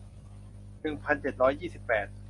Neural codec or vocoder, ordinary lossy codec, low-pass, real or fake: none; MP3, 48 kbps; 10.8 kHz; real